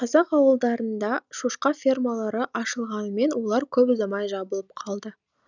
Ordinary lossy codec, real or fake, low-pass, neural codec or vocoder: none; real; 7.2 kHz; none